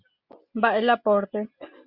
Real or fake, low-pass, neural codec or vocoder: real; 5.4 kHz; none